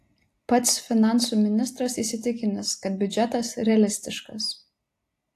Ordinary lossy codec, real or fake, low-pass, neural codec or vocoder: AAC, 64 kbps; real; 14.4 kHz; none